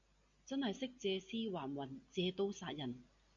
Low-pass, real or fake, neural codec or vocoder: 7.2 kHz; real; none